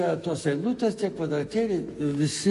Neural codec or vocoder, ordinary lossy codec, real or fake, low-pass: autoencoder, 48 kHz, 128 numbers a frame, DAC-VAE, trained on Japanese speech; MP3, 48 kbps; fake; 14.4 kHz